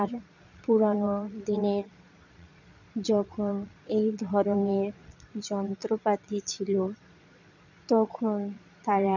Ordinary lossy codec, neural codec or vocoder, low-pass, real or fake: none; vocoder, 22.05 kHz, 80 mel bands, Vocos; 7.2 kHz; fake